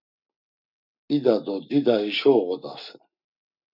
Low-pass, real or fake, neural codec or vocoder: 5.4 kHz; fake; codec, 44.1 kHz, 7.8 kbps, Pupu-Codec